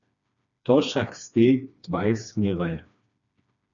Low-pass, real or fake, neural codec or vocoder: 7.2 kHz; fake; codec, 16 kHz, 2 kbps, FreqCodec, smaller model